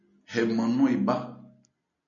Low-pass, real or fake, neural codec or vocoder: 7.2 kHz; real; none